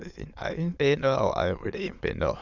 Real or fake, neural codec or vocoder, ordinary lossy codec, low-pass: fake; autoencoder, 22.05 kHz, a latent of 192 numbers a frame, VITS, trained on many speakers; Opus, 64 kbps; 7.2 kHz